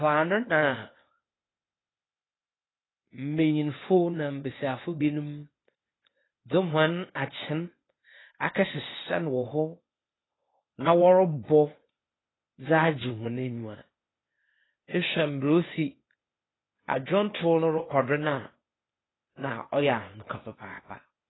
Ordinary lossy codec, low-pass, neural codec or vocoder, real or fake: AAC, 16 kbps; 7.2 kHz; codec, 16 kHz, 0.8 kbps, ZipCodec; fake